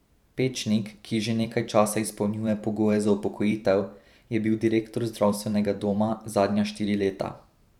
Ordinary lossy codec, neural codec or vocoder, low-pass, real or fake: none; vocoder, 44.1 kHz, 128 mel bands every 512 samples, BigVGAN v2; 19.8 kHz; fake